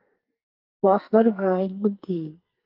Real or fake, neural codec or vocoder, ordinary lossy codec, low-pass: fake; codec, 44.1 kHz, 2.6 kbps, SNAC; Opus, 32 kbps; 5.4 kHz